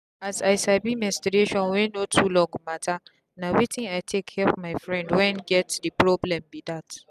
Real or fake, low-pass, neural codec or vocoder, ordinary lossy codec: real; 14.4 kHz; none; none